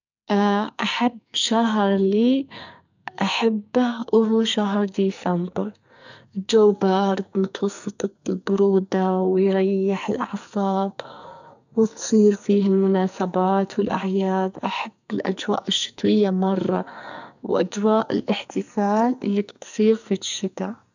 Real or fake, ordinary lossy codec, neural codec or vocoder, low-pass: fake; none; codec, 44.1 kHz, 2.6 kbps, SNAC; 7.2 kHz